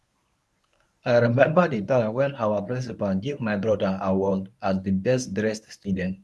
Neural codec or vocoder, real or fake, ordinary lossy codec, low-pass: codec, 24 kHz, 0.9 kbps, WavTokenizer, medium speech release version 1; fake; none; none